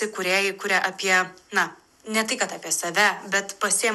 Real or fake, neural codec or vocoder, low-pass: real; none; 14.4 kHz